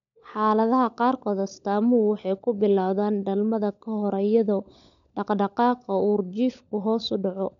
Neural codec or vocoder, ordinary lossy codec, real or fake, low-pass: codec, 16 kHz, 16 kbps, FunCodec, trained on LibriTTS, 50 frames a second; none; fake; 7.2 kHz